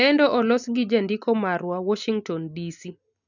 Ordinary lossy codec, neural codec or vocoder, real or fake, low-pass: none; none; real; 7.2 kHz